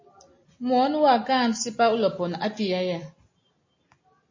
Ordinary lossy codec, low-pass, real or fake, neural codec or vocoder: MP3, 32 kbps; 7.2 kHz; real; none